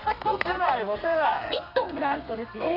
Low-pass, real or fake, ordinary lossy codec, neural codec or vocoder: 5.4 kHz; fake; none; codec, 32 kHz, 1.9 kbps, SNAC